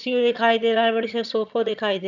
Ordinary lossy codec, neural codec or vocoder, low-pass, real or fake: none; vocoder, 22.05 kHz, 80 mel bands, HiFi-GAN; 7.2 kHz; fake